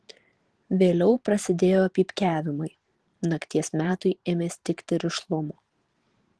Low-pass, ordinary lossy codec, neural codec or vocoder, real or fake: 10.8 kHz; Opus, 16 kbps; vocoder, 44.1 kHz, 128 mel bands every 512 samples, BigVGAN v2; fake